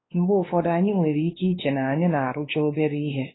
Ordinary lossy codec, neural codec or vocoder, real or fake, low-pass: AAC, 16 kbps; codec, 16 kHz, 2 kbps, X-Codec, WavLM features, trained on Multilingual LibriSpeech; fake; 7.2 kHz